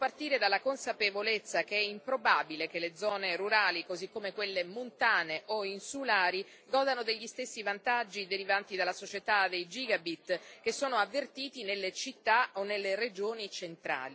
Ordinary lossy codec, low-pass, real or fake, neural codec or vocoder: none; none; real; none